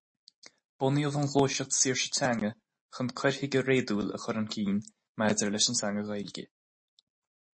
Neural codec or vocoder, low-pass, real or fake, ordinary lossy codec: none; 9.9 kHz; real; MP3, 32 kbps